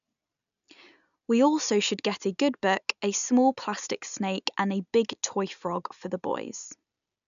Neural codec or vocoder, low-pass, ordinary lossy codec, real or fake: none; 7.2 kHz; none; real